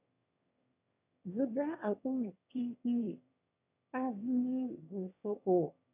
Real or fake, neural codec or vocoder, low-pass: fake; autoencoder, 22.05 kHz, a latent of 192 numbers a frame, VITS, trained on one speaker; 3.6 kHz